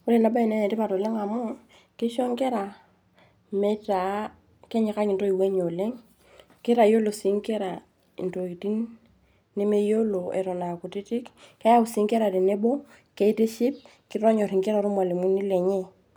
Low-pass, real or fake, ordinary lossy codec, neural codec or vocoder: none; real; none; none